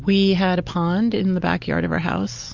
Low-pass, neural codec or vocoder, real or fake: 7.2 kHz; none; real